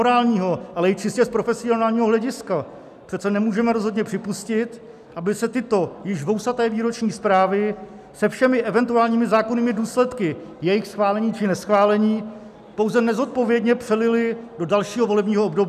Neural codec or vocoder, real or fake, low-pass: none; real; 14.4 kHz